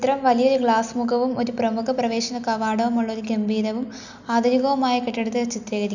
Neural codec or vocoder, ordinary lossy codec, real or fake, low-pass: none; none; real; 7.2 kHz